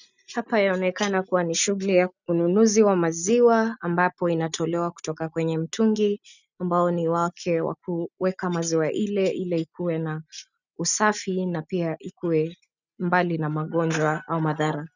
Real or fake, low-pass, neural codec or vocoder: real; 7.2 kHz; none